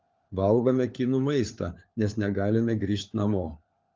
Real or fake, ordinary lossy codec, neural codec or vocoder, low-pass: fake; Opus, 32 kbps; codec, 16 kHz, 4 kbps, FunCodec, trained on LibriTTS, 50 frames a second; 7.2 kHz